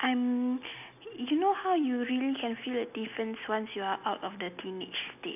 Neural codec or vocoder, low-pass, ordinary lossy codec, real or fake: none; 3.6 kHz; none; real